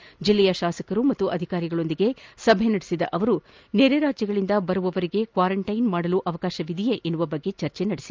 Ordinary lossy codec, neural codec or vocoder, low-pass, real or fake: Opus, 24 kbps; none; 7.2 kHz; real